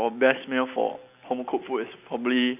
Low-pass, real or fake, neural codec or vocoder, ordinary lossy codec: 3.6 kHz; real; none; none